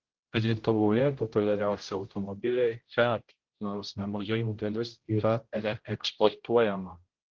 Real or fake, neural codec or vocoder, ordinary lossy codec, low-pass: fake; codec, 16 kHz, 0.5 kbps, X-Codec, HuBERT features, trained on general audio; Opus, 16 kbps; 7.2 kHz